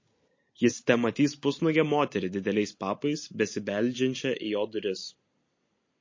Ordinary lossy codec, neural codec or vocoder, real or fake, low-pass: MP3, 32 kbps; none; real; 7.2 kHz